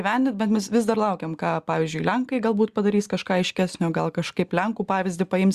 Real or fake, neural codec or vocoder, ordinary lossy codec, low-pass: real; none; Opus, 64 kbps; 14.4 kHz